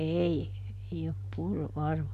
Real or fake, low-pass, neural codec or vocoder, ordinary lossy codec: real; 14.4 kHz; none; none